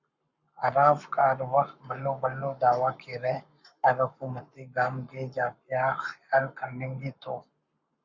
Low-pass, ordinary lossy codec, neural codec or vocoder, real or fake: 7.2 kHz; Opus, 64 kbps; codec, 44.1 kHz, 7.8 kbps, Pupu-Codec; fake